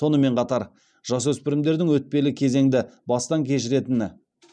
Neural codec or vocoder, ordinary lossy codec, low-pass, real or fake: none; none; 9.9 kHz; real